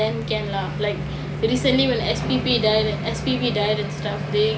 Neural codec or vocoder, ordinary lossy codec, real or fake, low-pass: none; none; real; none